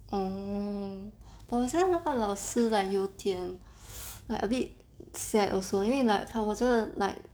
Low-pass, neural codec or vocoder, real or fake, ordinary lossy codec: none; codec, 44.1 kHz, 7.8 kbps, DAC; fake; none